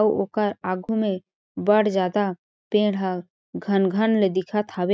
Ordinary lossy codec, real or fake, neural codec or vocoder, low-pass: none; real; none; none